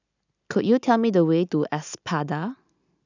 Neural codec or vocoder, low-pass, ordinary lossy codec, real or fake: none; 7.2 kHz; none; real